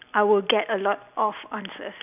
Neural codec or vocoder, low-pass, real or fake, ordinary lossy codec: none; 3.6 kHz; real; none